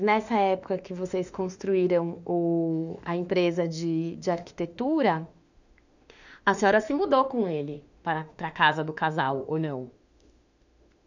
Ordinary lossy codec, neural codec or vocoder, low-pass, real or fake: none; autoencoder, 48 kHz, 32 numbers a frame, DAC-VAE, trained on Japanese speech; 7.2 kHz; fake